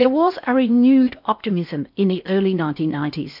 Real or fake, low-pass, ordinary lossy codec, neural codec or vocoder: fake; 5.4 kHz; MP3, 48 kbps; codec, 16 kHz in and 24 kHz out, 0.6 kbps, FocalCodec, streaming, 4096 codes